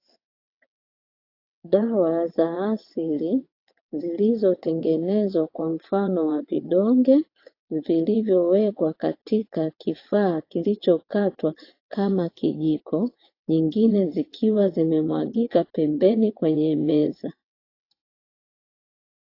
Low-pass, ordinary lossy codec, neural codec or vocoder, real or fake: 5.4 kHz; AAC, 32 kbps; vocoder, 22.05 kHz, 80 mel bands, WaveNeXt; fake